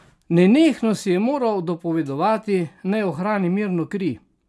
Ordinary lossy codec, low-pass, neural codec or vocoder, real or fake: none; none; none; real